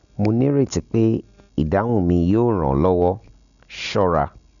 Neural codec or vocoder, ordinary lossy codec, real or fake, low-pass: none; none; real; 7.2 kHz